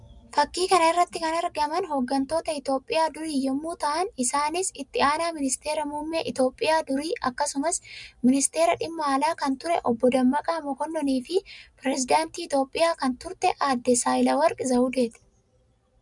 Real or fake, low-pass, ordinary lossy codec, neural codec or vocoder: real; 10.8 kHz; MP3, 96 kbps; none